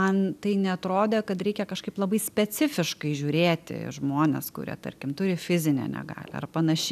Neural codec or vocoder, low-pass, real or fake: none; 14.4 kHz; real